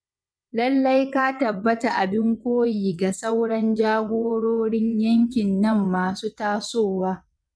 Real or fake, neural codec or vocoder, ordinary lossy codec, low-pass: fake; vocoder, 22.05 kHz, 80 mel bands, WaveNeXt; none; none